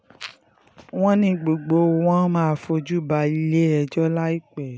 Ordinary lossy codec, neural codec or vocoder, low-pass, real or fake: none; none; none; real